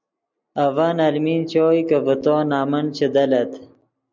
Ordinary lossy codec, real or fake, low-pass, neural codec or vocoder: MP3, 64 kbps; real; 7.2 kHz; none